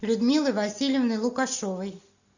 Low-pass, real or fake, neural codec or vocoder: 7.2 kHz; real; none